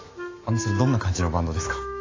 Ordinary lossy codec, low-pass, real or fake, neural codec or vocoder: AAC, 32 kbps; 7.2 kHz; fake; autoencoder, 48 kHz, 128 numbers a frame, DAC-VAE, trained on Japanese speech